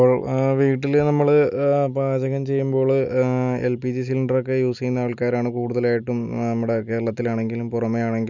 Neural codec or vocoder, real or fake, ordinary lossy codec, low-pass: none; real; none; 7.2 kHz